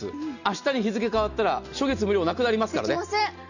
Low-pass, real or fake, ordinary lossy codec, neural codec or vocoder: 7.2 kHz; real; none; none